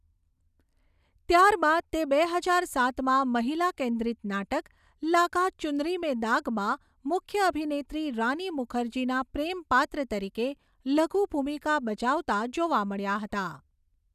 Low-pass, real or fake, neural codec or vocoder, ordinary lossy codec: 14.4 kHz; real; none; none